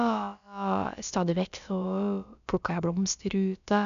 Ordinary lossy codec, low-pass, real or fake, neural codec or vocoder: none; 7.2 kHz; fake; codec, 16 kHz, about 1 kbps, DyCAST, with the encoder's durations